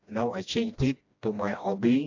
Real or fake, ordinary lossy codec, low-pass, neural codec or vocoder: fake; AAC, 48 kbps; 7.2 kHz; codec, 16 kHz, 1 kbps, FreqCodec, smaller model